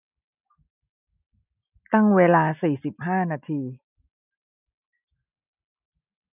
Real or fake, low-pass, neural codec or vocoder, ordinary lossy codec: real; 3.6 kHz; none; none